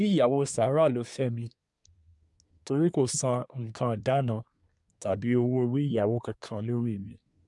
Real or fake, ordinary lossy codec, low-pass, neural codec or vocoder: fake; none; 10.8 kHz; codec, 24 kHz, 1 kbps, SNAC